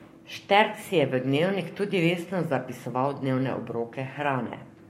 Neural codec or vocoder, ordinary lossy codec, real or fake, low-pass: codec, 44.1 kHz, 7.8 kbps, Pupu-Codec; MP3, 64 kbps; fake; 19.8 kHz